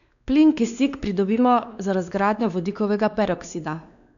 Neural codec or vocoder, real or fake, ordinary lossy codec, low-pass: codec, 16 kHz, 2 kbps, X-Codec, WavLM features, trained on Multilingual LibriSpeech; fake; none; 7.2 kHz